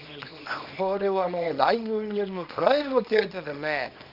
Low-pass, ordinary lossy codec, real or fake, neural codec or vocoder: 5.4 kHz; none; fake; codec, 24 kHz, 0.9 kbps, WavTokenizer, small release